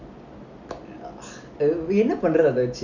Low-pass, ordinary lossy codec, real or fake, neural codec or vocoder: 7.2 kHz; none; real; none